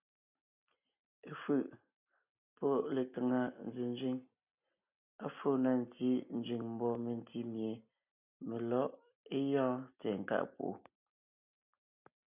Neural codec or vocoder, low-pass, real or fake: none; 3.6 kHz; real